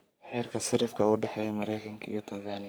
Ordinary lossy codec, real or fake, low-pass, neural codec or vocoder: none; fake; none; codec, 44.1 kHz, 3.4 kbps, Pupu-Codec